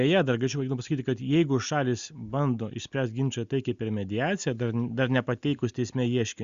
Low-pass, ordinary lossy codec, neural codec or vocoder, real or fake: 7.2 kHz; Opus, 64 kbps; none; real